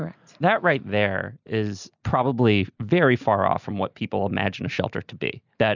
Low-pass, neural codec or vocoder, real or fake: 7.2 kHz; none; real